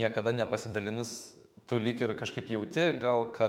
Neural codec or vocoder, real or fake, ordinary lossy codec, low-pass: autoencoder, 48 kHz, 32 numbers a frame, DAC-VAE, trained on Japanese speech; fake; MP3, 96 kbps; 19.8 kHz